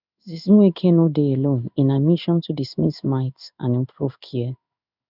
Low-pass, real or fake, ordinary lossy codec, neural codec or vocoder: 5.4 kHz; fake; none; codec, 16 kHz in and 24 kHz out, 1 kbps, XY-Tokenizer